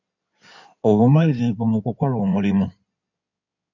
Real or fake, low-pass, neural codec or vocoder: fake; 7.2 kHz; codec, 16 kHz in and 24 kHz out, 2.2 kbps, FireRedTTS-2 codec